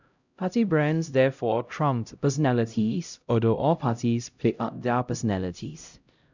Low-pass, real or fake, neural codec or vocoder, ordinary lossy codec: 7.2 kHz; fake; codec, 16 kHz, 0.5 kbps, X-Codec, HuBERT features, trained on LibriSpeech; none